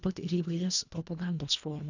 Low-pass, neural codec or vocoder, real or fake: 7.2 kHz; codec, 24 kHz, 1.5 kbps, HILCodec; fake